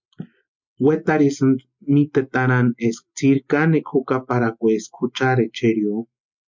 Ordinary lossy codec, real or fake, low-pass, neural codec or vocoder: MP3, 64 kbps; real; 7.2 kHz; none